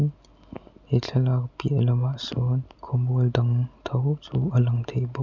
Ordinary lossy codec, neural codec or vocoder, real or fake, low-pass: none; none; real; 7.2 kHz